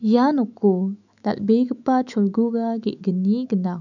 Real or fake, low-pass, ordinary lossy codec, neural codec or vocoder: fake; 7.2 kHz; none; autoencoder, 48 kHz, 128 numbers a frame, DAC-VAE, trained on Japanese speech